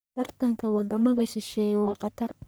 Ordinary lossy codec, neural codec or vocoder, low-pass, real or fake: none; codec, 44.1 kHz, 1.7 kbps, Pupu-Codec; none; fake